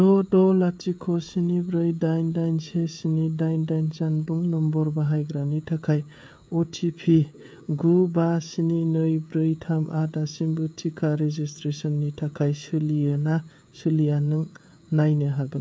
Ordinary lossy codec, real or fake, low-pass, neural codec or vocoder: none; fake; none; codec, 16 kHz, 16 kbps, FreqCodec, smaller model